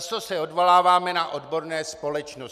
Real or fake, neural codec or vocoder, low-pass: real; none; 14.4 kHz